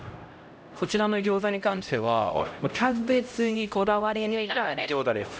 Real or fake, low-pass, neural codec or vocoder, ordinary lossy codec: fake; none; codec, 16 kHz, 0.5 kbps, X-Codec, HuBERT features, trained on LibriSpeech; none